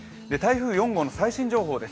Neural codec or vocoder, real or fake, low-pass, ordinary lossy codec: none; real; none; none